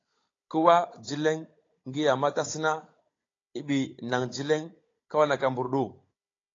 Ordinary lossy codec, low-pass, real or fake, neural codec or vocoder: AAC, 32 kbps; 7.2 kHz; fake; codec, 16 kHz, 16 kbps, FunCodec, trained on Chinese and English, 50 frames a second